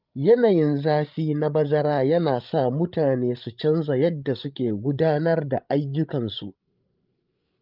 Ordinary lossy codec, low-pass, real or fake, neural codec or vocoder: Opus, 24 kbps; 5.4 kHz; fake; codec, 16 kHz, 16 kbps, FreqCodec, larger model